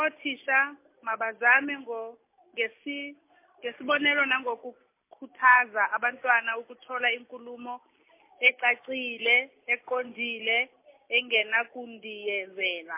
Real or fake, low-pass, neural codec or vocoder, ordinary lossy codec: real; 3.6 kHz; none; MP3, 24 kbps